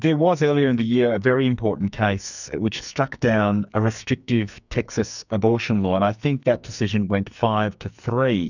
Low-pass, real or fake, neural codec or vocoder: 7.2 kHz; fake; codec, 44.1 kHz, 2.6 kbps, SNAC